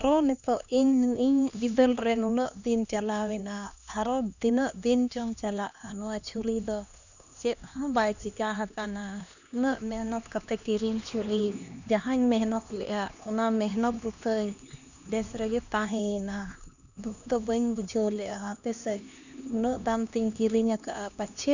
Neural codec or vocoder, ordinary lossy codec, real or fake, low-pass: codec, 16 kHz, 2 kbps, X-Codec, HuBERT features, trained on LibriSpeech; none; fake; 7.2 kHz